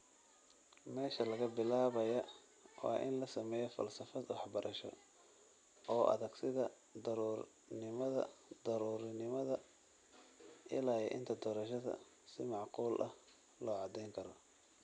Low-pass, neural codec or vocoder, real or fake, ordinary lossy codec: 9.9 kHz; none; real; none